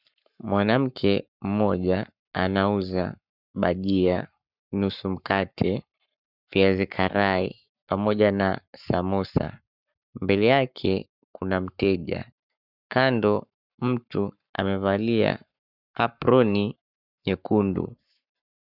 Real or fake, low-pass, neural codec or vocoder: fake; 5.4 kHz; codec, 44.1 kHz, 7.8 kbps, Pupu-Codec